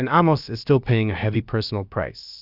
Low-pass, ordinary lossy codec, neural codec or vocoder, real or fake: 5.4 kHz; Opus, 64 kbps; codec, 16 kHz, about 1 kbps, DyCAST, with the encoder's durations; fake